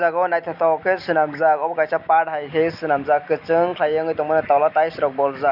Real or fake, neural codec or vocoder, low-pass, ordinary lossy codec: real; none; 5.4 kHz; none